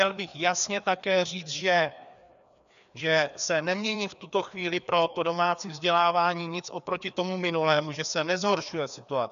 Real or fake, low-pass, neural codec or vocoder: fake; 7.2 kHz; codec, 16 kHz, 2 kbps, FreqCodec, larger model